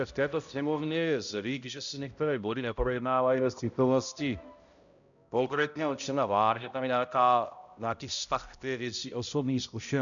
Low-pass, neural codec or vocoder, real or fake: 7.2 kHz; codec, 16 kHz, 0.5 kbps, X-Codec, HuBERT features, trained on balanced general audio; fake